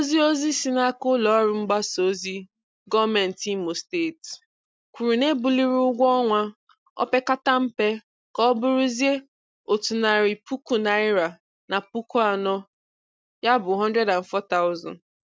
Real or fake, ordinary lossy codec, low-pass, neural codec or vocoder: real; none; none; none